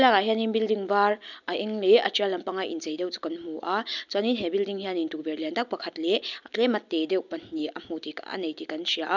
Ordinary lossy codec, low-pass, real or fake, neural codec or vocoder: none; 7.2 kHz; real; none